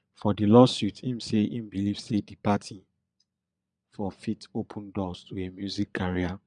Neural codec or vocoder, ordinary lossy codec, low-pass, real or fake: vocoder, 22.05 kHz, 80 mel bands, Vocos; none; 9.9 kHz; fake